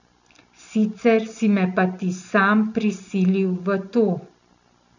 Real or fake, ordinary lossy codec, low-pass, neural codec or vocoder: real; none; 7.2 kHz; none